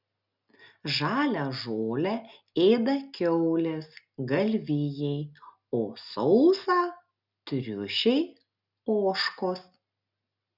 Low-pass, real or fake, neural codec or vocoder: 5.4 kHz; real; none